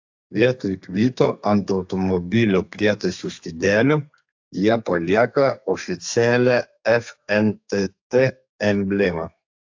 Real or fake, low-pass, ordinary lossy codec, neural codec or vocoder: fake; 7.2 kHz; AAC, 48 kbps; codec, 32 kHz, 1.9 kbps, SNAC